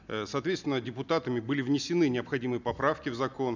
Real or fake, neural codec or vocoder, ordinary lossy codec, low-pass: real; none; none; 7.2 kHz